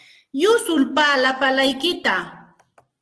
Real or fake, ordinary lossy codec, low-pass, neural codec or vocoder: real; Opus, 16 kbps; 10.8 kHz; none